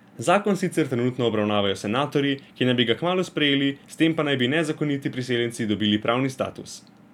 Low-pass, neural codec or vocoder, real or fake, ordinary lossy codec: 19.8 kHz; none; real; none